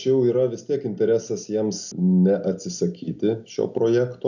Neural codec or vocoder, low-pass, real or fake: none; 7.2 kHz; real